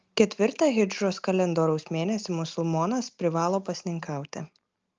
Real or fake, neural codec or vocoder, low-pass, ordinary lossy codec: real; none; 7.2 kHz; Opus, 24 kbps